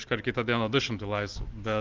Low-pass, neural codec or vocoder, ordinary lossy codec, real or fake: 7.2 kHz; none; Opus, 24 kbps; real